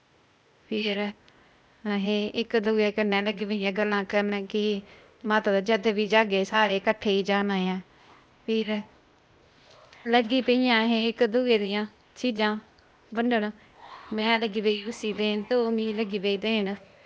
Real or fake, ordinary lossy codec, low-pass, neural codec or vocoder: fake; none; none; codec, 16 kHz, 0.8 kbps, ZipCodec